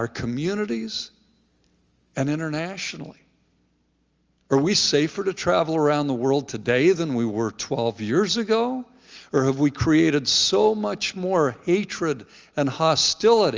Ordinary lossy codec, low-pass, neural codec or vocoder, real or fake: Opus, 32 kbps; 7.2 kHz; none; real